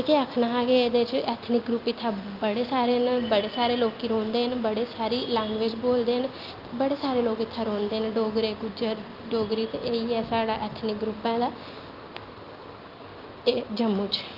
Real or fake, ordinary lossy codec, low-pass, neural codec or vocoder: real; Opus, 24 kbps; 5.4 kHz; none